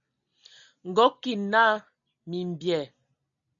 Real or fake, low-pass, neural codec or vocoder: real; 7.2 kHz; none